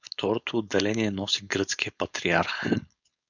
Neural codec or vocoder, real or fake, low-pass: codec, 16 kHz, 4.8 kbps, FACodec; fake; 7.2 kHz